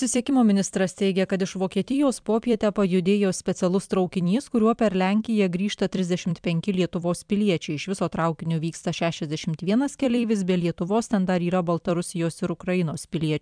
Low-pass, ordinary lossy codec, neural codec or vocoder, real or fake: 9.9 kHz; MP3, 96 kbps; vocoder, 44.1 kHz, 128 mel bands every 256 samples, BigVGAN v2; fake